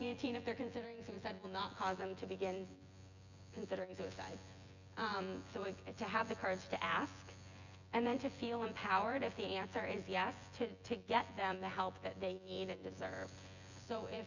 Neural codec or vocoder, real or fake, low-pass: vocoder, 24 kHz, 100 mel bands, Vocos; fake; 7.2 kHz